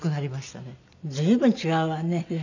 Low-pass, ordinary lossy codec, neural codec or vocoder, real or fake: 7.2 kHz; none; none; real